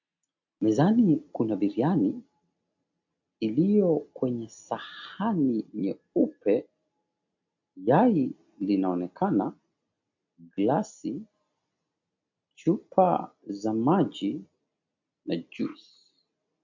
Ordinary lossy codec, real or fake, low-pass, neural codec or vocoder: MP3, 48 kbps; real; 7.2 kHz; none